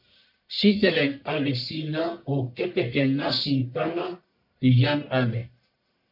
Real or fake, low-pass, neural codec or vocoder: fake; 5.4 kHz; codec, 44.1 kHz, 1.7 kbps, Pupu-Codec